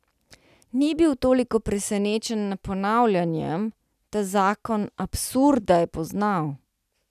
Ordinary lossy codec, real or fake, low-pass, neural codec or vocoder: none; real; 14.4 kHz; none